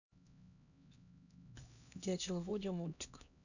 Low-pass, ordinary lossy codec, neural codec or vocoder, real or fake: 7.2 kHz; none; codec, 16 kHz, 1 kbps, X-Codec, HuBERT features, trained on LibriSpeech; fake